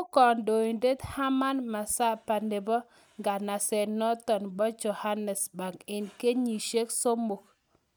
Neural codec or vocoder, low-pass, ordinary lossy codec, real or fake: none; none; none; real